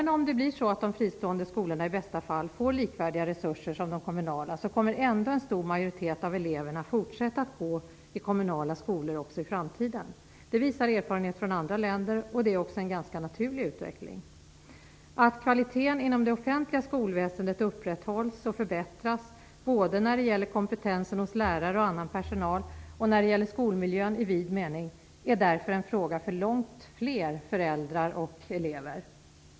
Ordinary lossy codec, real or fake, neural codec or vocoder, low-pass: none; real; none; none